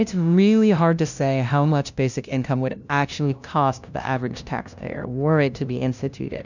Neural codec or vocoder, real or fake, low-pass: codec, 16 kHz, 0.5 kbps, FunCodec, trained on LibriTTS, 25 frames a second; fake; 7.2 kHz